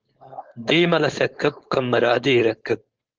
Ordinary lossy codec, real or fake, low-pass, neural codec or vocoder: Opus, 16 kbps; fake; 7.2 kHz; codec, 16 kHz, 4.8 kbps, FACodec